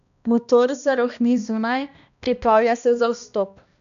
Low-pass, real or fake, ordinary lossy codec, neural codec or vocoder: 7.2 kHz; fake; none; codec, 16 kHz, 1 kbps, X-Codec, HuBERT features, trained on balanced general audio